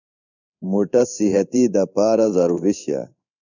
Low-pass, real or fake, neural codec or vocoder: 7.2 kHz; fake; codec, 16 kHz in and 24 kHz out, 1 kbps, XY-Tokenizer